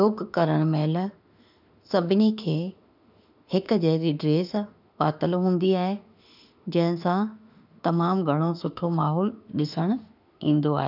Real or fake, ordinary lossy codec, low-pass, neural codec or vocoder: fake; none; 5.4 kHz; autoencoder, 48 kHz, 32 numbers a frame, DAC-VAE, trained on Japanese speech